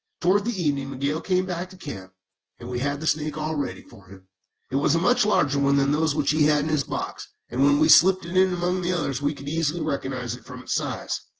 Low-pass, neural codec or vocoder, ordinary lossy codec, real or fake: 7.2 kHz; vocoder, 24 kHz, 100 mel bands, Vocos; Opus, 16 kbps; fake